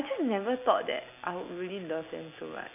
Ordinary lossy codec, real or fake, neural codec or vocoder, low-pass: none; real; none; 3.6 kHz